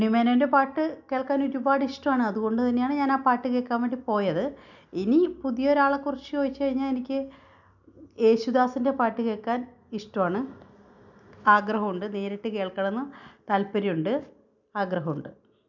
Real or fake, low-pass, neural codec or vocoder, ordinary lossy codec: real; 7.2 kHz; none; none